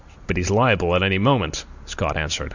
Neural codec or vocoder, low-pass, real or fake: none; 7.2 kHz; real